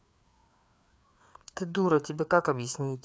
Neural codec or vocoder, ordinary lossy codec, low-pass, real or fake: codec, 16 kHz, 4 kbps, FreqCodec, larger model; none; none; fake